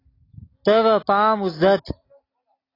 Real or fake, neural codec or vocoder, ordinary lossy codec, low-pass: real; none; AAC, 24 kbps; 5.4 kHz